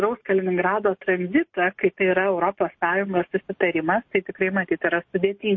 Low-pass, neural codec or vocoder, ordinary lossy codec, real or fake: 7.2 kHz; none; MP3, 32 kbps; real